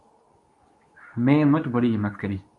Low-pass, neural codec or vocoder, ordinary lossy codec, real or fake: 10.8 kHz; codec, 24 kHz, 0.9 kbps, WavTokenizer, medium speech release version 2; MP3, 64 kbps; fake